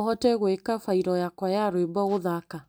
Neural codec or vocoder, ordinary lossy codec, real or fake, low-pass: none; none; real; none